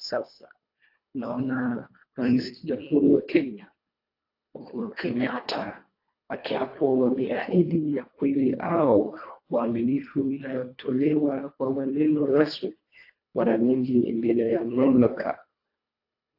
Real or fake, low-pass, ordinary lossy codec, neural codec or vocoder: fake; 5.4 kHz; AAC, 32 kbps; codec, 24 kHz, 1.5 kbps, HILCodec